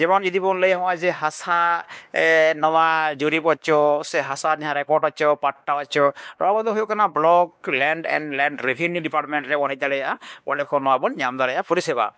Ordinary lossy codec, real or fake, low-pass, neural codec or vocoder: none; fake; none; codec, 16 kHz, 2 kbps, X-Codec, WavLM features, trained on Multilingual LibriSpeech